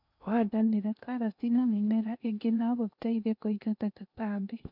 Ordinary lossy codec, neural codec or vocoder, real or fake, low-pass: none; codec, 16 kHz in and 24 kHz out, 0.8 kbps, FocalCodec, streaming, 65536 codes; fake; 5.4 kHz